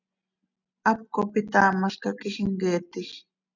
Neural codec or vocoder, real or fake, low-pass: none; real; 7.2 kHz